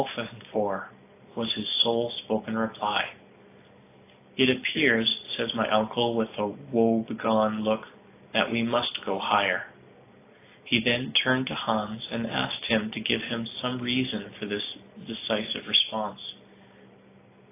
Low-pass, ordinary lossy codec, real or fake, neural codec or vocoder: 3.6 kHz; AAC, 24 kbps; real; none